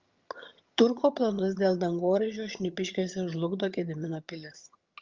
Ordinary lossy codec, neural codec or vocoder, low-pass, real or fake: Opus, 32 kbps; vocoder, 22.05 kHz, 80 mel bands, HiFi-GAN; 7.2 kHz; fake